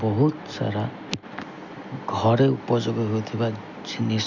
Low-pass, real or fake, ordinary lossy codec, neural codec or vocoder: 7.2 kHz; real; none; none